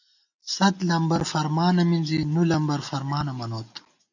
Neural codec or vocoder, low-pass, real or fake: none; 7.2 kHz; real